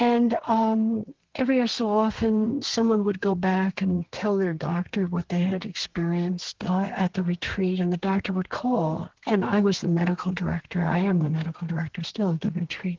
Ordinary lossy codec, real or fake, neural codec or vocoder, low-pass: Opus, 16 kbps; fake; codec, 32 kHz, 1.9 kbps, SNAC; 7.2 kHz